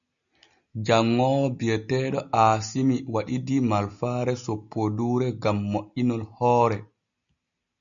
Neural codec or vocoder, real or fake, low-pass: none; real; 7.2 kHz